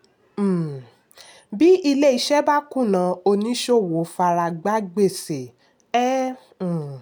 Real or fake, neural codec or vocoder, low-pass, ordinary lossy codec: real; none; none; none